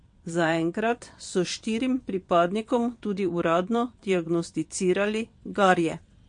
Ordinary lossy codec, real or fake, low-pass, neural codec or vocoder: MP3, 48 kbps; fake; 9.9 kHz; vocoder, 22.05 kHz, 80 mel bands, WaveNeXt